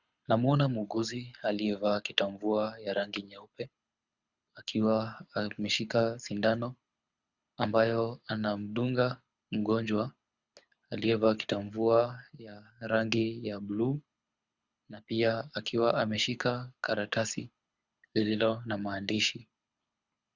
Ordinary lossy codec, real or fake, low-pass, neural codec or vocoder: Opus, 64 kbps; fake; 7.2 kHz; codec, 24 kHz, 6 kbps, HILCodec